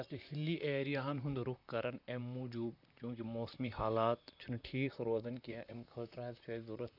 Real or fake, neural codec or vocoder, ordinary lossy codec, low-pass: fake; vocoder, 44.1 kHz, 128 mel bands, Pupu-Vocoder; none; 5.4 kHz